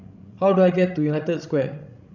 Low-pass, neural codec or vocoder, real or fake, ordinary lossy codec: 7.2 kHz; codec, 16 kHz, 16 kbps, FreqCodec, larger model; fake; none